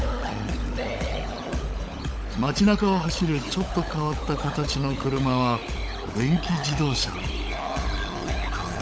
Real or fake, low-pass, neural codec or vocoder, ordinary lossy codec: fake; none; codec, 16 kHz, 16 kbps, FunCodec, trained on LibriTTS, 50 frames a second; none